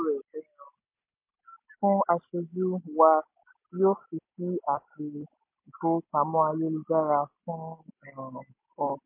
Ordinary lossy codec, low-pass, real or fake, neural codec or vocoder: MP3, 24 kbps; 3.6 kHz; real; none